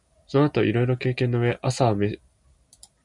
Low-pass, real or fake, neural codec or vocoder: 10.8 kHz; real; none